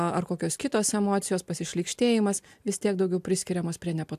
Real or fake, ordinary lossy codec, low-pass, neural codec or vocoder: real; AAC, 96 kbps; 14.4 kHz; none